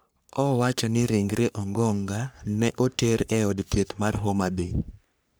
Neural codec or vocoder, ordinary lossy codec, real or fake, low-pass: codec, 44.1 kHz, 3.4 kbps, Pupu-Codec; none; fake; none